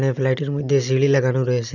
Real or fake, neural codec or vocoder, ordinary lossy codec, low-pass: real; none; none; 7.2 kHz